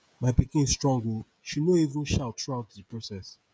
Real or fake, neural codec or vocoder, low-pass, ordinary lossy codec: fake; codec, 16 kHz, 16 kbps, FreqCodec, smaller model; none; none